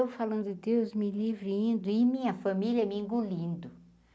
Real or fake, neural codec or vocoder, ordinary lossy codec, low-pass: real; none; none; none